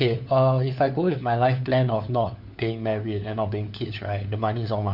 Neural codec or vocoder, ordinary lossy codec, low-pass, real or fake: codec, 16 kHz, 4 kbps, FunCodec, trained on LibriTTS, 50 frames a second; none; 5.4 kHz; fake